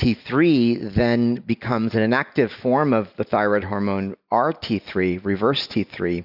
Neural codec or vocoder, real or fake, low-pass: none; real; 5.4 kHz